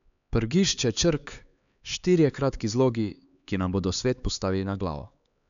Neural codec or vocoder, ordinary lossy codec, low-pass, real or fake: codec, 16 kHz, 4 kbps, X-Codec, HuBERT features, trained on LibriSpeech; MP3, 96 kbps; 7.2 kHz; fake